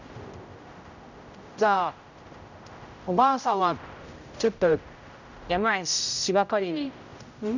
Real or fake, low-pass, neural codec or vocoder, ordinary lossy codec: fake; 7.2 kHz; codec, 16 kHz, 0.5 kbps, X-Codec, HuBERT features, trained on general audio; none